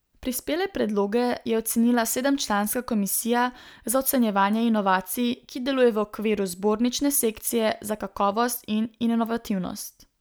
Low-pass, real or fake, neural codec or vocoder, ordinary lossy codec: none; real; none; none